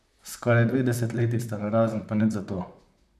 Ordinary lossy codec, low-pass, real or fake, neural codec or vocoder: none; 14.4 kHz; fake; vocoder, 44.1 kHz, 128 mel bands, Pupu-Vocoder